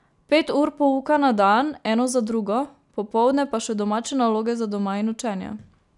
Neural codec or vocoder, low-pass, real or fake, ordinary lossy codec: none; 10.8 kHz; real; none